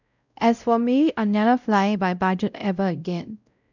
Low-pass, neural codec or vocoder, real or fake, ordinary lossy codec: 7.2 kHz; codec, 16 kHz, 0.5 kbps, X-Codec, WavLM features, trained on Multilingual LibriSpeech; fake; none